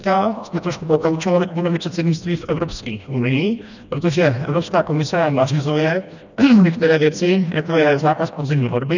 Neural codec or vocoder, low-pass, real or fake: codec, 16 kHz, 1 kbps, FreqCodec, smaller model; 7.2 kHz; fake